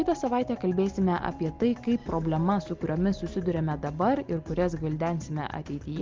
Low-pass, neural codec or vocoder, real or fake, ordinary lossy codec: 7.2 kHz; none; real; Opus, 24 kbps